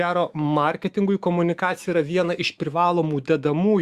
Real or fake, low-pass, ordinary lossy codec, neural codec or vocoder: fake; 14.4 kHz; Opus, 64 kbps; autoencoder, 48 kHz, 128 numbers a frame, DAC-VAE, trained on Japanese speech